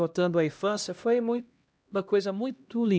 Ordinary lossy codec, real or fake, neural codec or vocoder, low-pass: none; fake; codec, 16 kHz, 1 kbps, X-Codec, HuBERT features, trained on LibriSpeech; none